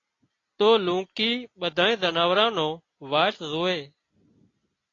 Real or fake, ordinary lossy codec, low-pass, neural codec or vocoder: real; AAC, 32 kbps; 7.2 kHz; none